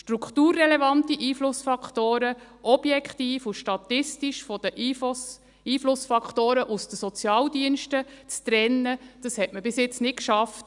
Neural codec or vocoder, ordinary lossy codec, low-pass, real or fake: none; none; 10.8 kHz; real